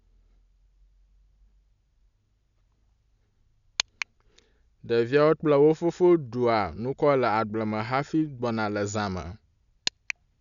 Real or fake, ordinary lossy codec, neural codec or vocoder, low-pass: real; none; none; 7.2 kHz